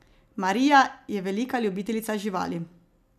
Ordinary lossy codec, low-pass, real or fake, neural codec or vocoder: none; 14.4 kHz; real; none